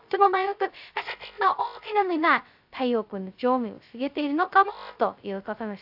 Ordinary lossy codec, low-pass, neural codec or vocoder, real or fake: AAC, 48 kbps; 5.4 kHz; codec, 16 kHz, 0.2 kbps, FocalCodec; fake